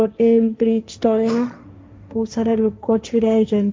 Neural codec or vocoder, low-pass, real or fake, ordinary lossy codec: codec, 16 kHz, 1.1 kbps, Voila-Tokenizer; none; fake; none